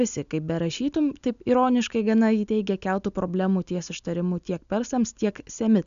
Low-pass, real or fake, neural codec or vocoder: 7.2 kHz; real; none